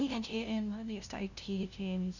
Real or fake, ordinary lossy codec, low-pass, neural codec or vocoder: fake; none; 7.2 kHz; codec, 16 kHz, 0.5 kbps, FunCodec, trained on LibriTTS, 25 frames a second